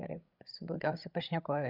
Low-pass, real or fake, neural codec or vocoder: 5.4 kHz; fake; codec, 16 kHz, 16 kbps, FreqCodec, larger model